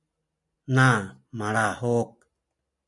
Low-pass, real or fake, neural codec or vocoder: 10.8 kHz; real; none